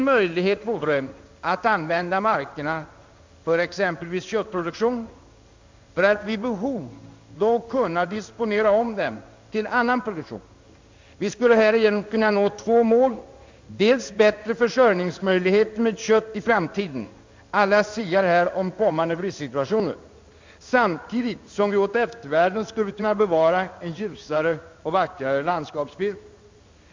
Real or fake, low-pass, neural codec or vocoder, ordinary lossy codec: fake; 7.2 kHz; codec, 16 kHz in and 24 kHz out, 1 kbps, XY-Tokenizer; MP3, 64 kbps